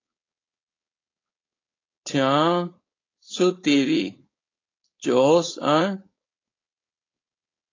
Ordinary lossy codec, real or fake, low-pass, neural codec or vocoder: AAC, 32 kbps; fake; 7.2 kHz; codec, 16 kHz, 4.8 kbps, FACodec